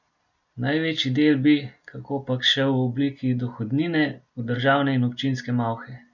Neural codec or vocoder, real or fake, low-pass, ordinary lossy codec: none; real; 7.2 kHz; none